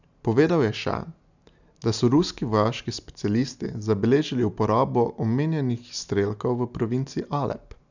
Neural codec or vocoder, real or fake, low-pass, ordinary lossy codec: none; real; 7.2 kHz; none